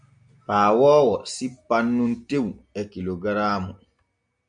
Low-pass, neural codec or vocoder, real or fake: 9.9 kHz; none; real